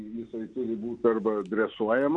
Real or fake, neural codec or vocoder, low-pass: real; none; 9.9 kHz